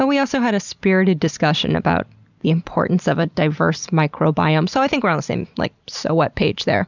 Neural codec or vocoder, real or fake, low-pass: vocoder, 44.1 kHz, 128 mel bands every 256 samples, BigVGAN v2; fake; 7.2 kHz